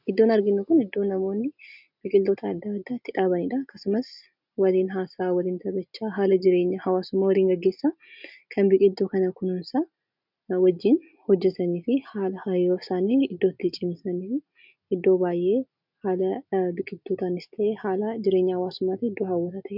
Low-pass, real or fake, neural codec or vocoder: 5.4 kHz; real; none